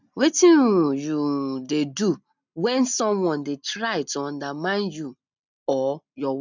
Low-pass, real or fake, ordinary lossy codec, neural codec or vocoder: 7.2 kHz; real; none; none